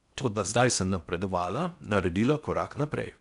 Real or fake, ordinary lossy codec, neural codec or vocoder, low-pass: fake; none; codec, 16 kHz in and 24 kHz out, 0.8 kbps, FocalCodec, streaming, 65536 codes; 10.8 kHz